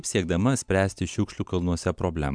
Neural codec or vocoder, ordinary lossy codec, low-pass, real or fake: none; MP3, 96 kbps; 9.9 kHz; real